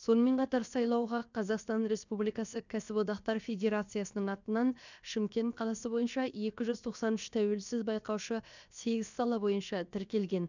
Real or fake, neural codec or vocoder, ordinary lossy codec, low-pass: fake; codec, 16 kHz, 0.7 kbps, FocalCodec; none; 7.2 kHz